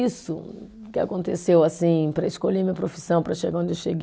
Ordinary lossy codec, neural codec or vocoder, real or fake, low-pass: none; none; real; none